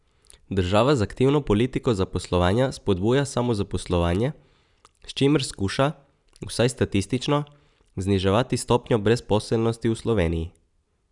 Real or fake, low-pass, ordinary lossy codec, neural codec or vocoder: real; 10.8 kHz; none; none